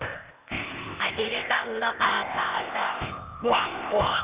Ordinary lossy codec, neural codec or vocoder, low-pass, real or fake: Opus, 64 kbps; codec, 16 kHz, 0.8 kbps, ZipCodec; 3.6 kHz; fake